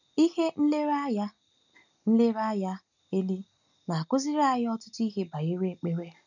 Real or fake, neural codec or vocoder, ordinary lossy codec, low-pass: real; none; none; 7.2 kHz